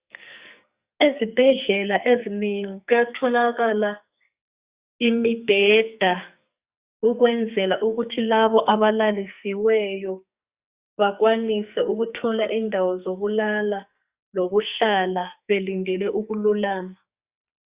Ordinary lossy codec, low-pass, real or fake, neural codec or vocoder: Opus, 64 kbps; 3.6 kHz; fake; codec, 44.1 kHz, 2.6 kbps, SNAC